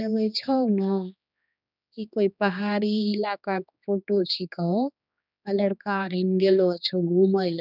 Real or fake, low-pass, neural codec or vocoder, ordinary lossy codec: fake; 5.4 kHz; codec, 16 kHz, 2 kbps, X-Codec, HuBERT features, trained on general audio; none